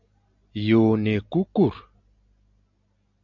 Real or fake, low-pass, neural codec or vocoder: real; 7.2 kHz; none